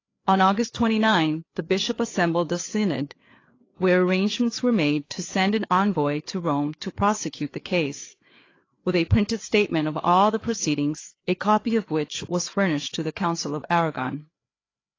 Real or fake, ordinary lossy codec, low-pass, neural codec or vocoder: fake; AAC, 32 kbps; 7.2 kHz; codec, 16 kHz, 4 kbps, FreqCodec, larger model